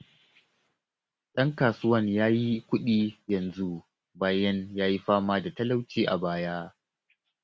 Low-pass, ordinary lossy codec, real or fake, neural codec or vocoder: none; none; real; none